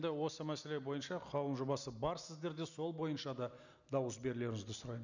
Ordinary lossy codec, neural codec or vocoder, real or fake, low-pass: none; none; real; 7.2 kHz